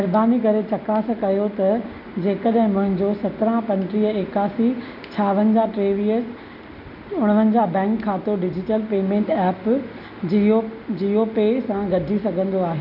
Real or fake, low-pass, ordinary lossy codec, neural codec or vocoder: real; 5.4 kHz; none; none